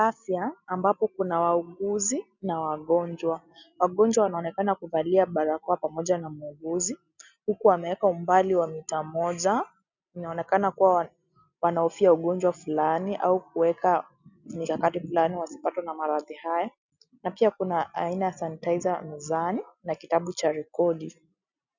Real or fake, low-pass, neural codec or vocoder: real; 7.2 kHz; none